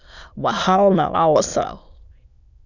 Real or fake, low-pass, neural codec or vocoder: fake; 7.2 kHz; autoencoder, 22.05 kHz, a latent of 192 numbers a frame, VITS, trained on many speakers